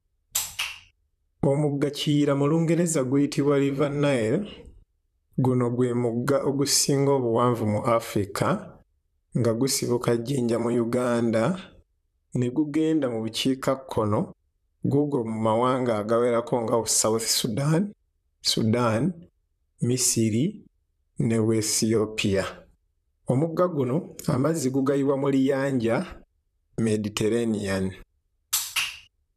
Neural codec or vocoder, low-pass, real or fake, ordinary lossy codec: vocoder, 44.1 kHz, 128 mel bands, Pupu-Vocoder; 14.4 kHz; fake; none